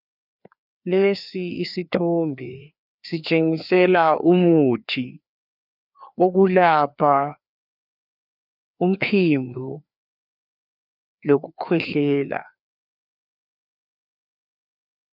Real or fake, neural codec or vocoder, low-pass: fake; codec, 16 kHz, 2 kbps, FreqCodec, larger model; 5.4 kHz